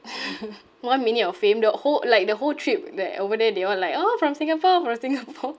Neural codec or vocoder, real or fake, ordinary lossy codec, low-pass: none; real; none; none